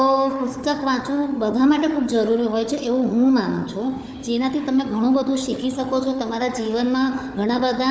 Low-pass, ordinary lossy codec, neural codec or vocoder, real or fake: none; none; codec, 16 kHz, 4 kbps, FunCodec, trained on Chinese and English, 50 frames a second; fake